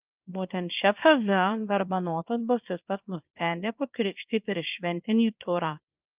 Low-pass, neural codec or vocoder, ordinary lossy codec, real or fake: 3.6 kHz; codec, 24 kHz, 0.9 kbps, WavTokenizer, small release; Opus, 32 kbps; fake